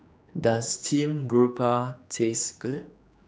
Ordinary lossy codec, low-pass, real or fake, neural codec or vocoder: none; none; fake; codec, 16 kHz, 2 kbps, X-Codec, HuBERT features, trained on general audio